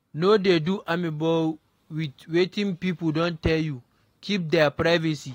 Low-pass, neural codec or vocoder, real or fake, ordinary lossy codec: 19.8 kHz; none; real; AAC, 48 kbps